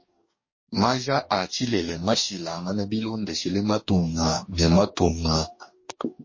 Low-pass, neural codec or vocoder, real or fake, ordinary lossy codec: 7.2 kHz; codec, 44.1 kHz, 2.6 kbps, DAC; fake; MP3, 32 kbps